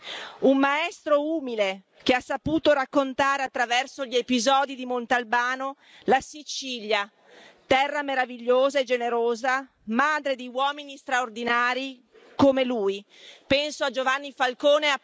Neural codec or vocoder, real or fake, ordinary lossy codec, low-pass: none; real; none; none